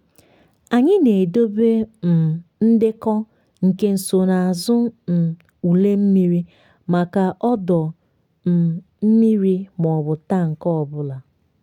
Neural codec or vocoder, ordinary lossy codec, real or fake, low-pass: none; none; real; 19.8 kHz